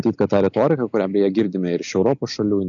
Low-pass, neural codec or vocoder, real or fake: 7.2 kHz; none; real